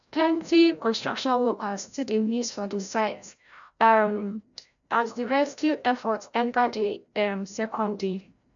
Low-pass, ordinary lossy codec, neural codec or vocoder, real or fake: 7.2 kHz; none; codec, 16 kHz, 0.5 kbps, FreqCodec, larger model; fake